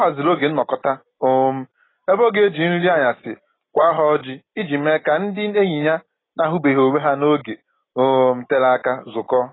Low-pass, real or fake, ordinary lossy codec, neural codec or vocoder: 7.2 kHz; real; AAC, 16 kbps; none